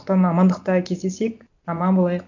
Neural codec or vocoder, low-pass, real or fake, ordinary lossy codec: none; 7.2 kHz; real; none